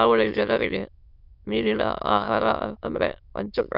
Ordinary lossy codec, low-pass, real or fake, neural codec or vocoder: none; 5.4 kHz; fake; autoencoder, 22.05 kHz, a latent of 192 numbers a frame, VITS, trained on many speakers